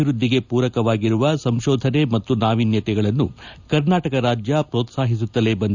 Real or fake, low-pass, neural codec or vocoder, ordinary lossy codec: real; 7.2 kHz; none; none